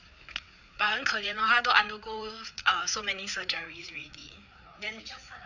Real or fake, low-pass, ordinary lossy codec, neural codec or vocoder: fake; 7.2 kHz; none; codec, 16 kHz, 4 kbps, FreqCodec, larger model